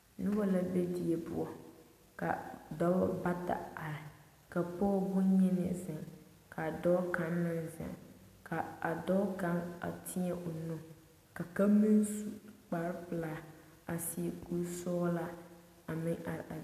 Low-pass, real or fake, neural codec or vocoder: 14.4 kHz; real; none